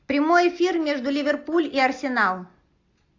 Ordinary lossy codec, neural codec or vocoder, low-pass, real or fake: AAC, 48 kbps; none; 7.2 kHz; real